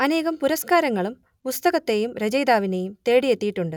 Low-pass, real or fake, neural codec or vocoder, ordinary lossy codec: 19.8 kHz; real; none; none